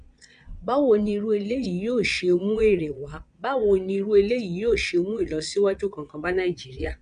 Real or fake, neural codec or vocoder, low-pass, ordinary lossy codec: fake; vocoder, 22.05 kHz, 80 mel bands, Vocos; 9.9 kHz; none